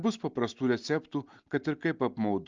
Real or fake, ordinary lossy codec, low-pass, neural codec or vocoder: real; Opus, 24 kbps; 7.2 kHz; none